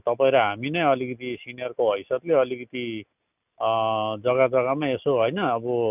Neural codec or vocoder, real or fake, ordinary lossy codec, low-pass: none; real; none; 3.6 kHz